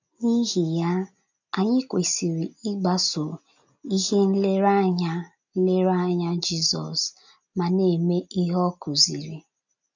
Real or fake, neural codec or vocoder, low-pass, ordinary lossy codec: real; none; 7.2 kHz; none